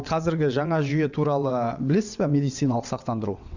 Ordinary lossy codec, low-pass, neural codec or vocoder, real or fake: none; 7.2 kHz; vocoder, 22.05 kHz, 80 mel bands, WaveNeXt; fake